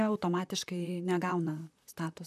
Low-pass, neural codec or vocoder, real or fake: 14.4 kHz; vocoder, 44.1 kHz, 128 mel bands, Pupu-Vocoder; fake